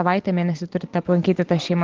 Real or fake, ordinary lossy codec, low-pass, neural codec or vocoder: real; Opus, 16 kbps; 7.2 kHz; none